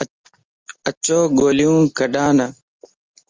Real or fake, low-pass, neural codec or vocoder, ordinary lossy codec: real; 7.2 kHz; none; Opus, 32 kbps